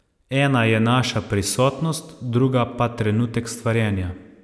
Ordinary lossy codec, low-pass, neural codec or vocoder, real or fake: none; none; none; real